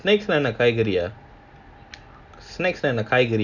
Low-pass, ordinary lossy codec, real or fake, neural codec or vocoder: 7.2 kHz; none; real; none